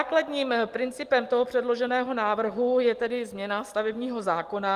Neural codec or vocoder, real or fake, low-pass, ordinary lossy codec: none; real; 14.4 kHz; Opus, 24 kbps